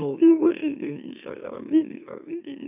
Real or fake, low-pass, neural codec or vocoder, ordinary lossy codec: fake; 3.6 kHz; autoencoder, 44.1 kHz, a latent of 192 numbers a frame, MeloTTS; none